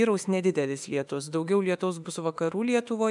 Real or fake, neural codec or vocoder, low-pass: fake; autoencoder, 48 kHz, 32 numbers a frame, DAC-VAE, trained on Japanese speech; 10.8 kHz